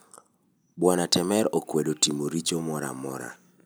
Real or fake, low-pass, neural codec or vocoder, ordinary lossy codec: real; none; none; none